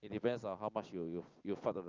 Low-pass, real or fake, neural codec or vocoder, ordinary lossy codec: 7.2 kHz; real; none; Opus, 24 kbps